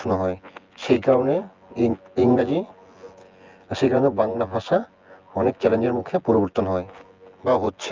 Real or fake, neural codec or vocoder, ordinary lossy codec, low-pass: fake; vocoder, 24 kHz, 100 mel bands, Vocos; Opus, 32 kbps; 7.2 kHz